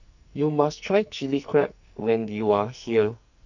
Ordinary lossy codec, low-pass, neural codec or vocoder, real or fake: none; 7.2 kHz; codec, 44.1 kHz, 2.6 kbps, SNAC; fake